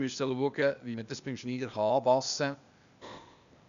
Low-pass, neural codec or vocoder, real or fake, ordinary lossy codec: 7.2 kHz; codec, 16 kHz, 0.8 kbps, ZipCodec; fake; none